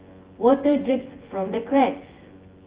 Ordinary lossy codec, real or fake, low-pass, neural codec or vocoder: Opus, 16 kbps; fake; 3.6 kHz; vocoder, 24 kHz, 100 mel bands, Vocos